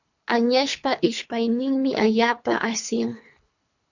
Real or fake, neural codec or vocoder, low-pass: fake; codec, 24 kHz, 3 kbps, HILCodec; 7.2 kHz